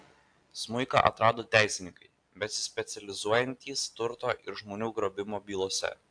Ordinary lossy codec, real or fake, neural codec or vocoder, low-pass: AAC, 64 kbps; real; none; 9.9 kHz